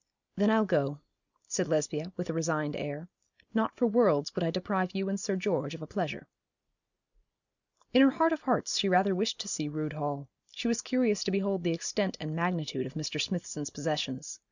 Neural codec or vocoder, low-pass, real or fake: none; 7.2 kHz; real